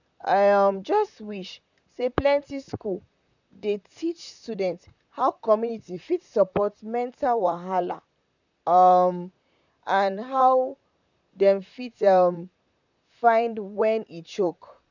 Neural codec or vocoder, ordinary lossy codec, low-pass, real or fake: vocoder, 44.1 kHz, 128 mel bands, Pupu-Vocoder; none; 7.2 kHz; fake